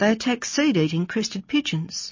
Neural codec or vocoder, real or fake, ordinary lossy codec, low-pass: none; real; MP3, 32 kbps; 7.2 kHz